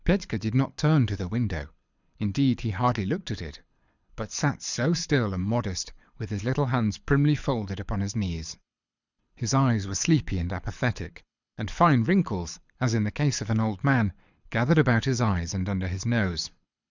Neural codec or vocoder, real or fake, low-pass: codec, 24 kHz, 6 kbps, HILCodec; fake; 7.2 kHz